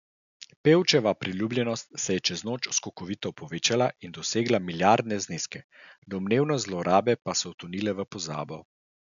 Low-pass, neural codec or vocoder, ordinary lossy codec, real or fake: 7.2 kHz; none; MP3, 96 kbps; real